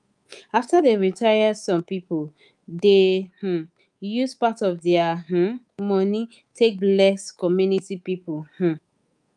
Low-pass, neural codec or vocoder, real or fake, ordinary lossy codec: 10.8 kHz; codec, 24 kHz, 3.1 kbps, DualCodec; fake; Opus, 32 kbps